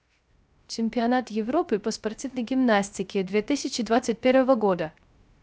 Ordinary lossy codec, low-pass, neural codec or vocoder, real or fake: none; none; codec, 16 kHz, 0.3 kbps, FocalCodec; fake